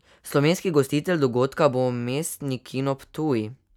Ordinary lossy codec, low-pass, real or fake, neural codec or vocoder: none; 19.8 kHz; real; none